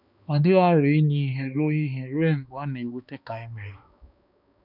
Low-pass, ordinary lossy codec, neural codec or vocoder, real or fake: 5.4 kHz; none; codec, 16 kHz, 2 kbps, X-Codec, HuBERT features, trained on balanced general audio; fake